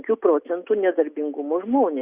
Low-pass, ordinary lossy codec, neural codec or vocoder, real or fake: 3.6 kHz; Opus, 64 kbps; none; real